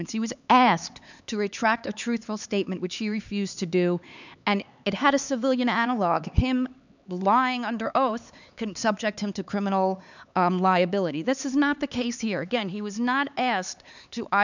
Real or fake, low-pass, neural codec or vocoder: fake; 7.2 kHz; codec, 16 kHz, 4 kbps, X-Codec, HuBERT features, trained on LibriSpeech